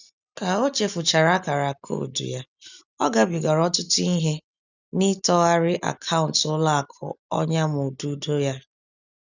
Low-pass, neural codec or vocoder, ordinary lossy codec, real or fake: 7.2 kHz; none; none; real